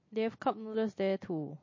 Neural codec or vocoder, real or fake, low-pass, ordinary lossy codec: none; real; 7.2 kHz; MP3, 32 kbps